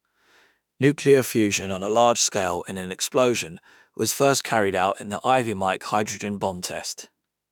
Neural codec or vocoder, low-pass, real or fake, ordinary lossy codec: autoencoder, 48 kHz, 32 numbers a frame, DAC-VAE, trained on Japanese speech; 19.8 kHz; fake; none